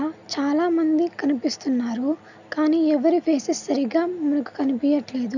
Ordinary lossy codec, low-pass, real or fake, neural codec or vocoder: none; 7.2 kHz; real; none